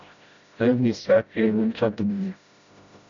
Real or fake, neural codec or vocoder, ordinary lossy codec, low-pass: fake; codec, 16 kHz, 0.5 kbps, FreqCodec, smaller model; AAC, 64 kbps; 7.2 kHz